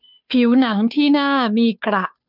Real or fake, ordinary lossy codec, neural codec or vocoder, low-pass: fake; none; codec, 16 kHz, 2 kbps, FunCodec, trained on Chinese and English, 25 frames a second; 5.4 kHz